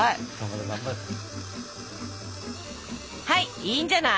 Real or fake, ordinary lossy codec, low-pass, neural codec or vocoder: real; none; none; none